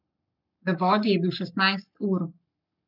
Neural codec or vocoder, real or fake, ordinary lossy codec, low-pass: codec, 44.1 kHz, 7.8 kbps, Pupu-Codec; fake; none; 5.4 kHz